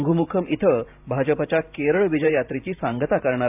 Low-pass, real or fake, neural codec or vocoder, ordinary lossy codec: 3.6 kHz; fake; vocoder, 44.1 kHz, 128 mel bands every 512 samples, BigVGAN v2; AAC, 32 kbps